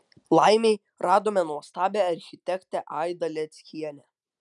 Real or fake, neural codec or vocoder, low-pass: real; none; 10.8 kHz